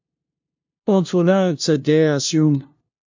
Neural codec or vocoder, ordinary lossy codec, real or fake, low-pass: codec, 16 kHz, 0.5 kbps, FunCodec, trained on LibriTTS, 25 frames a second; MP3, 64 kbps; fake; 7.2 kHz